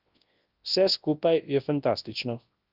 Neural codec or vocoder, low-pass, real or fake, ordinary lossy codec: codec, 24 kHz, 0.9 kbps, WavTokenizer, large speech release; 5.4 kHz; fake; Opus, 32 kbps